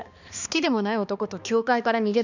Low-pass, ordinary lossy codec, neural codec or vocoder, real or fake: 7.2 kHz; none; codec, 16 kHz, 2 kbps, X-Codec, HuBERT features, trained on balanced general audio; fake